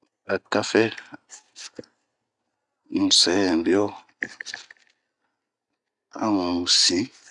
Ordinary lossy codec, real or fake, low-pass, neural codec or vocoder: none; real; 10.8 kHz; none